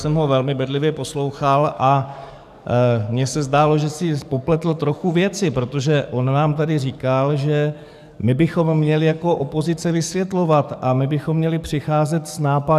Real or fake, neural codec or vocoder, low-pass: fake; codec, 44.1 kHz, 7.8 kbps, DAC; 14.4 kHz